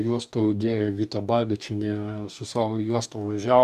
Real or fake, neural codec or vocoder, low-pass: fake; codec, 44.1 kHz, 2.6 kbps, DAC; 14.4 kHz